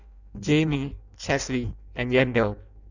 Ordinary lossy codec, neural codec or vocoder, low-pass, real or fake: none; codec, 16 kHz in and 24 kHz out, 0.6 kbps, FireRedTTS-2 codec; 7.2 kHz; fake